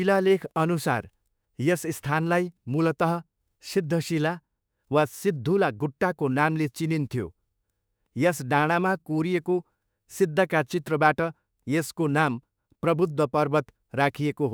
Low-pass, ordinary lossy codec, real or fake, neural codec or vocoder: none; none; fake; autoencoder, 48 kHz, 32 numbers a frame, DAC-VAE, trained on Japanese speech